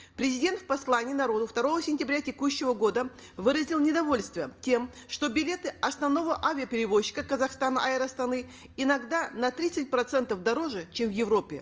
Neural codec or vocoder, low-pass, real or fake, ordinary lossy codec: none; 7.2 kHz; real; Opus, 24 kbps